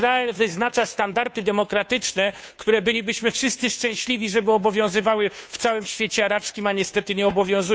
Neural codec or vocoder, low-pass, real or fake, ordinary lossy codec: codec, 16 kHz, 2 kbps, FunCodec, trained on Chinese and English, 25 frames a second; none; fake; none